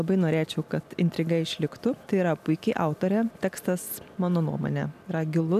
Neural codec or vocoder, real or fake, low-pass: none; real; 14.4 kHz